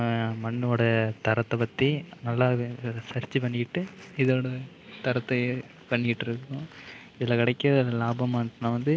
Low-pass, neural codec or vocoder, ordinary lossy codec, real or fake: none; none; none; real